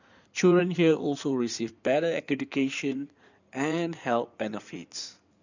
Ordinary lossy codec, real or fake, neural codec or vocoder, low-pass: none; fake; codec, 16 kHz in and 24 kHz out, 2.2 kbps, FireRedTTS-2 codec; 7.2 kHz